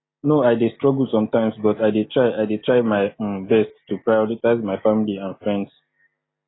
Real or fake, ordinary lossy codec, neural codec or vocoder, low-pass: real; AAC, 16 kbps; none; 7.2 kHz